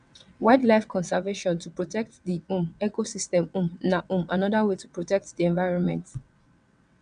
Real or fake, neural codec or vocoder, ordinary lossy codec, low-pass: fake; vocoder, 22.05 kHz, 80 mel bands, WaveNeXt; none; 9.9 kHz